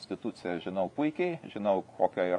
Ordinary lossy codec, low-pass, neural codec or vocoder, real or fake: AAC, 48 kbps; 10.8 kHz; vocoder, 24 kHz, 100 mel bands, Vocos; fake